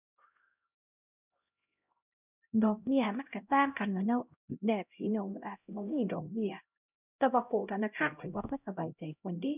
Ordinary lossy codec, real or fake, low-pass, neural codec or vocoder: none; fake; 3.6 kHz; codec, 16 kHz, 0.5 kbps, X-Codec, HuBERT features, trained on LibriSpeech